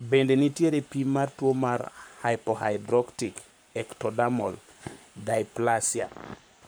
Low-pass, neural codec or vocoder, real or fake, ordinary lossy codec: none; codec, 44.1 kHz, 7.8 kbps, Pupu-Codec; fake; none